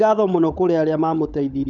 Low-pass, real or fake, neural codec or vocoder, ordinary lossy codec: 7.2 kHz; fake; codec, 16 kHz, 16 kbps, FunCodec, trained on LibriTTS, 50 frames a second; none